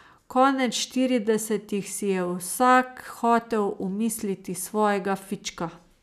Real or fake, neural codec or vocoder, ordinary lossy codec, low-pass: real; none; none; 14.4 kHz